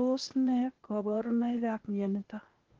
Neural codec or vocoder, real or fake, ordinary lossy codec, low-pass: codec, 16 kHz, 0.8 kbps, ZipCodec; fake; Opus, 16 kbps; 7.2 kHz